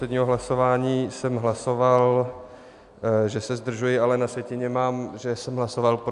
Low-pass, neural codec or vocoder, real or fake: 10.8 kHz; none; real